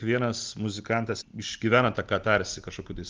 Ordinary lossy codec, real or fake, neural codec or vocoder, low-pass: Opus, 32 kbps; real; none; 7.2 kHz